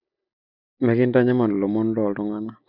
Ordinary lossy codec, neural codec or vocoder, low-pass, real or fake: none; none; 5.4 kHz; real